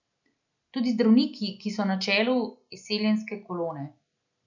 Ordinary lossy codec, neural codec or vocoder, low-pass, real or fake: none; none; 7.2 kHz; real